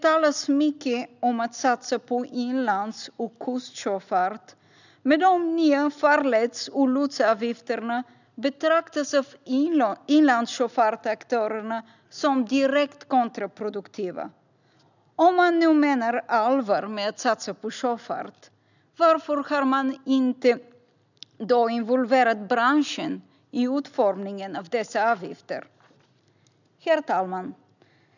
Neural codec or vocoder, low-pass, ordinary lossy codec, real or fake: none; 7.2 kHz; none; real